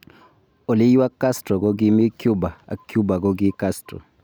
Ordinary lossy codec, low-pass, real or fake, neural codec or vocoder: none; none; real; none